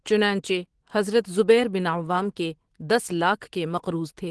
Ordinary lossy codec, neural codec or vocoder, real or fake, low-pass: Opus, 16 kbps; autoencoder, 48 kHz, 128 numbers a frame, DAC-VAE, trained on Japanese speech; fake; 10.8 kHz